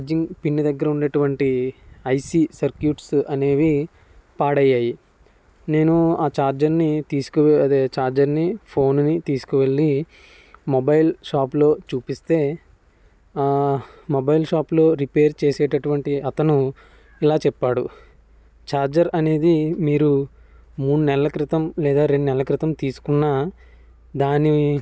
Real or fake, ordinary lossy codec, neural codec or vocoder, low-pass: real; none; none; none